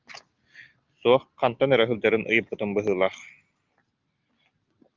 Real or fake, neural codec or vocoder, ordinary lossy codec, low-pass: real; none; Opus, 24 kbps; 7.2 kHz